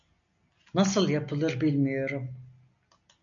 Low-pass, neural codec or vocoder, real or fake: 7.2 kHz; none; real